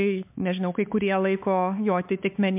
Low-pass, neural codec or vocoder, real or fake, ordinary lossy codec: 3.6 kHz; codec, 16 kHz, 4 kbps, X-Codec, HuBERT features, trained on LibriSpeech; fake; MP3, 32 kbps